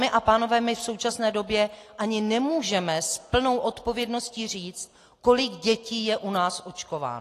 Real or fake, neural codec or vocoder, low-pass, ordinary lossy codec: real; none; 14.4 kHz; AAC, 48 kbps